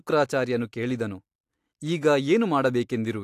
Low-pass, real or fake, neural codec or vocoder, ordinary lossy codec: 14.4 kHz; real; none; AAC, 64 kbps